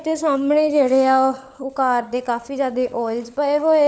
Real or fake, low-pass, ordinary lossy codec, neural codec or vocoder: fake; none; none; codec, 16 kHz, 16 kbps, FunCodec, trained on LibriTTS, 50 frames a second